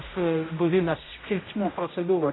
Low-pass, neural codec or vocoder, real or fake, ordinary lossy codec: 7.2 kHz; codec, 16 kHz, 0.5 kbps, X-Codec, HuBERT features, trained on general audio; fake; AAC, 16 kbps